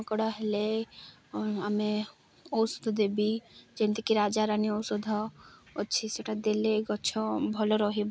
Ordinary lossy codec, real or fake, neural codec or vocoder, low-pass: none; real; none; none